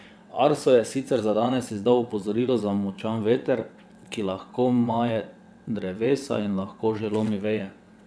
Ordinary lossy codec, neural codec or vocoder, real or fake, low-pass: none; vocoder, 22.05 kHz, 80 mel bands, WaveNeXt; fake; none